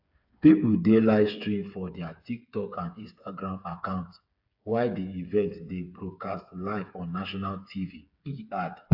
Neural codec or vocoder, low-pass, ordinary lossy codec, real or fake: codec, 16 kHz, 8 kbps, FreqCodec, smaller model; 5.4 kHz; AAC, 48 kbps; fake